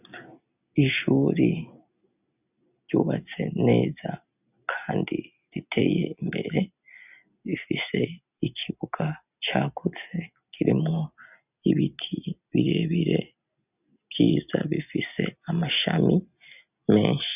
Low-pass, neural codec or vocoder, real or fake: 3.6 kHz; none; real